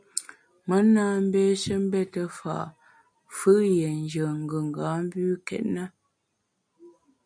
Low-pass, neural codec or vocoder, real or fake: 9.9 kHz; none; real